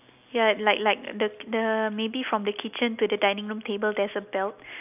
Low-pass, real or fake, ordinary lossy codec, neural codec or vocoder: 3.6 kHz; real; none; none